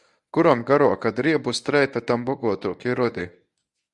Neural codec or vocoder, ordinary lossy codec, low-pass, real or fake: codec, 24 kHz, 0.9 kbps, WavTokenizer, medium speech release version 1; Opus, 64 kbps; 10.8 kHz; fake